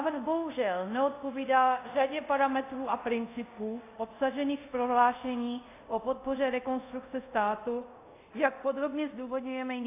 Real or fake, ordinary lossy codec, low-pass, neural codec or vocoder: fake; AAC, 24 kbps; 3.6 kHz; codec, 24 kHz, 0.5 kbps, DualCodec